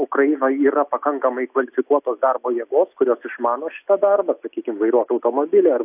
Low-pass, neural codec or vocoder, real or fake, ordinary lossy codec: 3.6 kHz; none; real; AAC, 32 kbps